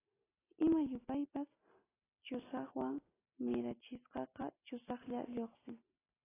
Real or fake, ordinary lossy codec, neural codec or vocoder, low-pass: real; AAC, 16 kbps; none; 3.6 kHz